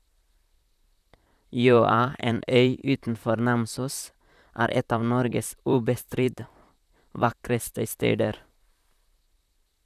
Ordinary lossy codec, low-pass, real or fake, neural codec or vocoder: none; 14.4 kHz; fake; vocoder, 44.1 kHz, 128 mel bands, Pupu-Vocoder